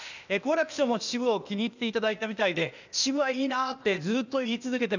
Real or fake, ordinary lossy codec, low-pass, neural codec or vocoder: fake; none; 7.2 kHz; codec, 16 kHz, 0.8 kbps, ZipCodec